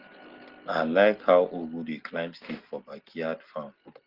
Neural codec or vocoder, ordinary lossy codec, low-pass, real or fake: none; Opus, 16 kbps; 5.4 kHz; real